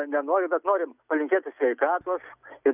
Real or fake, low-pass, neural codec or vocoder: real; 3.6 kHz; none